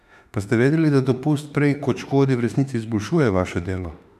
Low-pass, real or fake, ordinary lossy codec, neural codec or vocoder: 14.4 kHz; fake; none; autoencoder, 48 kHz, 32 numbers a frame, DAC-VAE, trained on Japanese speech